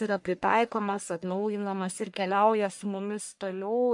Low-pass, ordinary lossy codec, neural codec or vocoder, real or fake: 10.8 kHz; MP3, 64 kbps; codec, 44.1 kHz, 1.7 kbps, Pupu-Codec; fake